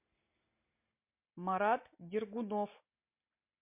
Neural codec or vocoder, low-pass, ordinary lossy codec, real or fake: none; 3.6 kHz; MP3, 32 kbps; real